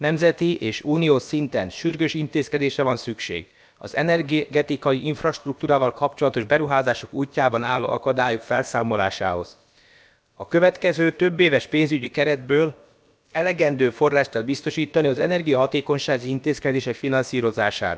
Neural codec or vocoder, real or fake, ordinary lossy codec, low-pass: codec, 16 kHz, about 1 kbps, DyCAST, with the encoder's durations; fake; none; none